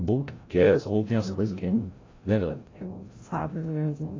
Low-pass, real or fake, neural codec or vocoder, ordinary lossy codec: 7.2 kHz; fake; codec, 16 kHz, 0.5 kbps, FreqCodec, larger model; AAC, 32 kbps